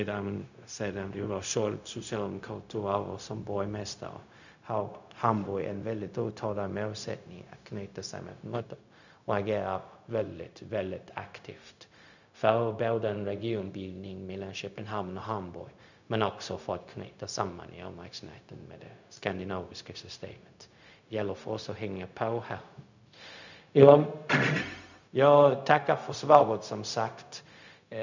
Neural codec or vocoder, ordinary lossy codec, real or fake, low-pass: codec, 16 kHz, 0.4 kbps, LongCat-Audio-Codec; none; fake; 7.2 kHz